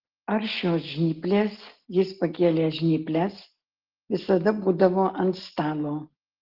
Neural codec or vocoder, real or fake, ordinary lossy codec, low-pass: none; real; Opus, 16 kbps; 5.4 kHz